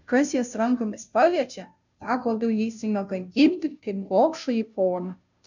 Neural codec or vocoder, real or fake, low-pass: codec, 16 kHz, 0.5 kbps, FunCodec, trained on Chinese and English, 25 frames a second; fake; 7.2 kHz